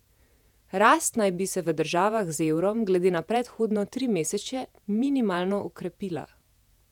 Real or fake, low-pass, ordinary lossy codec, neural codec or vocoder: fake; 19.8 kHz; none; vocoder, 48 kHz, 128 mel bands, Vocos